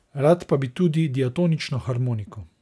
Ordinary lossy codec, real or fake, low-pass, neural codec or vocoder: none; real; none; none